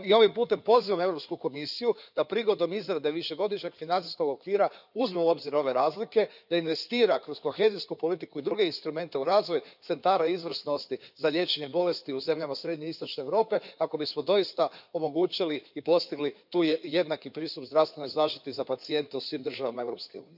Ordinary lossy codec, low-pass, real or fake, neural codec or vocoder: none; 5.4 kHz; fake; codec, 16 kHz in and 24 kHz out, 2.2 kbps, FireRedTTS-2 codec